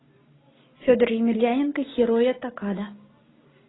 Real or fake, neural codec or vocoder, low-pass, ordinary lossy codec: real; none; 7.2 kHz; AAC, 16 kbps